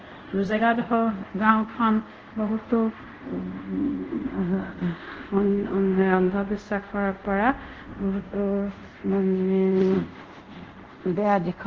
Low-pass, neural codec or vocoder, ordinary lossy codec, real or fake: 7.2 kHz; codec, 24 kHz, 0.5 kbps, DualCodec; Opus, 16 kbps; fake